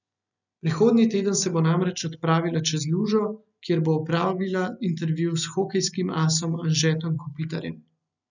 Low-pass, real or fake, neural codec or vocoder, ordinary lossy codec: 7.2 kHz; real; none; none